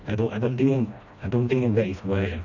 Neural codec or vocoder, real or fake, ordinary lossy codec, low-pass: codec, 16 kHz, 1 kbps, FreqCodec, smaller model; fake; none; 7.2 kHz